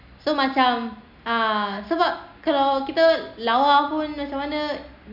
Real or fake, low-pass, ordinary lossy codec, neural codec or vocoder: real; 5.4 kHz; none; none